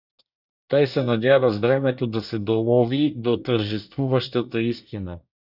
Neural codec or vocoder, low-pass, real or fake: codec, 24 kHz, 1 kbps, SNAC; 5.4 kHz; fake